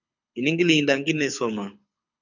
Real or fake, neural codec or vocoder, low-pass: fake; codec, 24 kHz, 6 kbps, HILCodec; 7.2 kHz